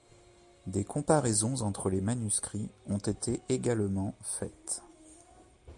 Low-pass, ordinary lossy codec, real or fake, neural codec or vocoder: 10.8 kHz; MP3, 64 kbps; real; none